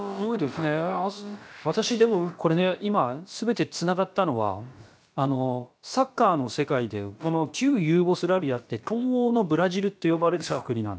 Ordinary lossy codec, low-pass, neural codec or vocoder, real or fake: none; none; codec, 16 kHz, about 1 kbps, DyCAST, with the encoder's durations; fake